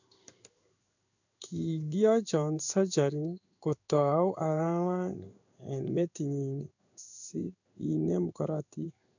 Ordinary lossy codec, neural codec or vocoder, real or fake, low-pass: none; codec, 16 kHz in and 24 kHz out, 1 kbps, XY-Tokenizer; fake; 7.2 kHz